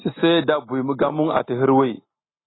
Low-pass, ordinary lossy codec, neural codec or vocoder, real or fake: 7.2 kHz; AAC, 16 kbps; none; real